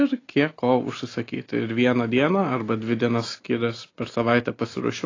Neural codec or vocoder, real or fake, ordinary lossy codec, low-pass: none; real; AAC, 32 kbps; 7.2 kHz